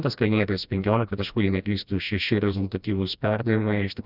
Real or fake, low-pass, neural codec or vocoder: fake; 5.4 kHz; codec, 16 kHz, 1 kbps, FreqCodec, smaller model